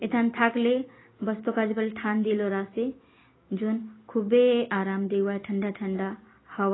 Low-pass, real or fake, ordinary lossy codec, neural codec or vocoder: 7.2 kHz; real; AAC, 16 kbps; none